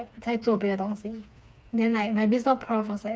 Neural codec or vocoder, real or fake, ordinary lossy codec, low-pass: codec, 16 kHz, 4 kbps, FreqCodec, smaller model; fake; none; none